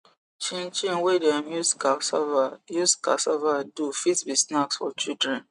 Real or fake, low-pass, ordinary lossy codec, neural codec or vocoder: real; 10.8 kHz; none; none